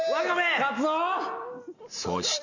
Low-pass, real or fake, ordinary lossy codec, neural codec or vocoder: 7.2 kHz; real; AAC, 48 kbps; none